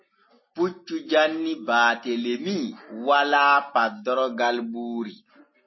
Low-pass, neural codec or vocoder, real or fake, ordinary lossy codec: 7.2 kHz; none; real; MP3, 24 kbps